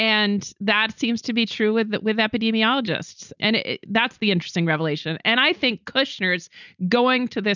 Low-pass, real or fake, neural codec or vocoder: 7.2 kHz; real; none